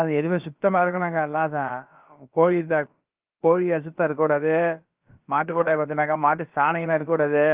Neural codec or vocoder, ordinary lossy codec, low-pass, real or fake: codec, 16 kHz, about 1 kbps, DyCAST, with the encoder's durations; Opus, 32 kbps; 3.6 kHz; fake